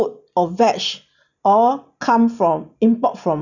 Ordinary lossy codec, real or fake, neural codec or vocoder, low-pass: none; real; none; 7.2 kHz